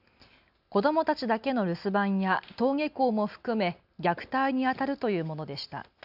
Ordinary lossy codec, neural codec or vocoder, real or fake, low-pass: none; none; real; 5.4 kHz